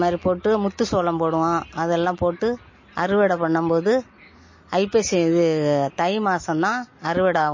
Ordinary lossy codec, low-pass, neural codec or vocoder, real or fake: MP3, 32 kbps; 7.2 kHz; none; real